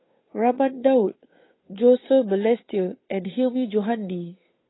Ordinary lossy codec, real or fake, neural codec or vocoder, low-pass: AAC, 16 kbps; fake; codec, 16 kHz, 8 kbps, FunCodec, trained on Chinese and English, 25 frames a second; 7.2 kHz